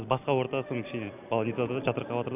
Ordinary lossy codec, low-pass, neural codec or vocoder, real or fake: none; 3.6 kHz; none; real